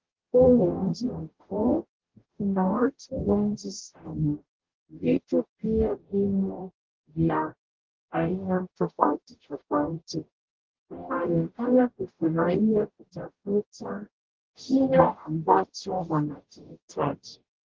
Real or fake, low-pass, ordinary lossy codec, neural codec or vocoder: fake; 7.2 kHz; Opus, 16 kbps; codec, 44.1 kHz, 0.9 kbps, DAC